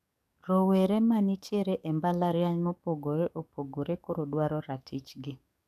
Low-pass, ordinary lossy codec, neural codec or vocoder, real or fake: 14.4 kHz; none; codec, 44.1 kHz, 7.8 kbps, DAC; fake